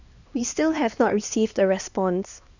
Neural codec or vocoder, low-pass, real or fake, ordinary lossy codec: codec, 16 kHz, 2 kbps, X-Codec, HuBERT features, trained on LibriSpeech; 7.2 kHz; fake; none